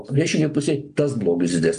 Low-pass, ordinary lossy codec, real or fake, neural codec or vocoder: 9.9 kHz; AAC, 64 kbps; real; none